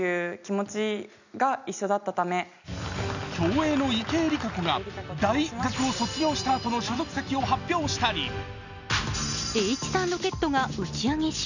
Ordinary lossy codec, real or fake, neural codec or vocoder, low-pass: none; real; none; 7.2 kHz